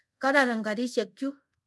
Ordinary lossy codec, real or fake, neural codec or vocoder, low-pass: none; fake; codec, 24 kHz, 0.5 kbps, DualCodec; none